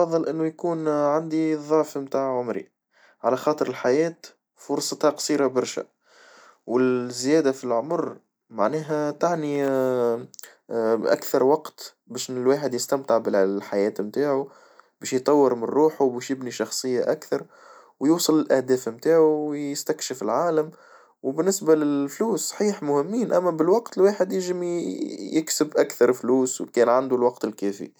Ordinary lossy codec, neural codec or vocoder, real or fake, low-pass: none; none; real; none